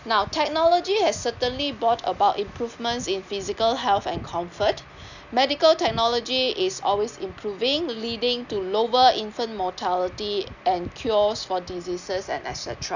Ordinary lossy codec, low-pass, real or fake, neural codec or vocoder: none; 7.2 kHz; real; none